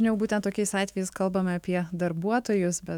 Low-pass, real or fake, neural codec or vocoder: 19.8 kHz; fake; autoencoder, 48 kHz, 128 numbers a frame, DAC-VAE, trained on Japanese speech